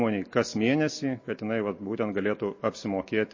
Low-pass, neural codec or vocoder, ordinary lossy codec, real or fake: 7.2 kHz; none; MP3, 32 kbps; real